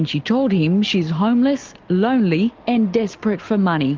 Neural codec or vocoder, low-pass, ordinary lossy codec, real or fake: none; 7.2 kHz; Opus, 32 kbps; real